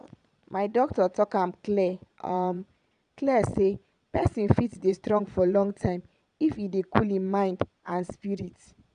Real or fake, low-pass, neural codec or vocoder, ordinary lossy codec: fake; 9.9 kHz; vocoder, 22.05 kHz, 80 mel bands, Vocos; none